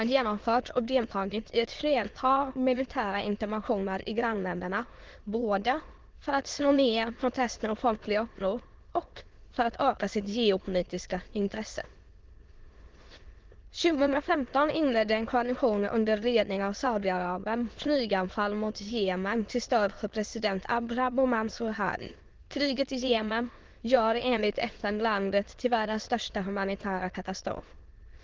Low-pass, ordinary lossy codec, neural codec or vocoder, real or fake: 7.2 kHz; Opus, 16 kbps; autoencoder, 22.05 kHz, a latent of 192 numbers a frame, VITS, trained on many speakers; fake